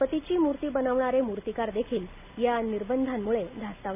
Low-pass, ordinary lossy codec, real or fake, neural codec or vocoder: 3.6 kHz; none; real; none